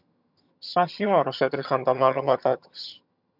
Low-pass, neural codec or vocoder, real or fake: 5.4 kHz; vocoder, 22.05 kHz, 80 mel bands, HiFi-GAN; fake